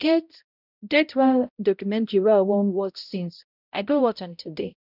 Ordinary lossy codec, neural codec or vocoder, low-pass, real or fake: none; codec, 16 kHz, 0.5 kbps, X-Codec, HuBERT features, trained on balanced general audio; 5.4 kHz; fake